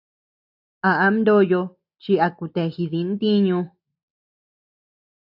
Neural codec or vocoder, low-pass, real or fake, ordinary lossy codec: none; 5.4 kHz; real; AAC, 48 kbps